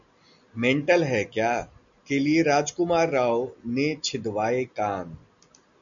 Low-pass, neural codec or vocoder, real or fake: 7.2 kHz; none; real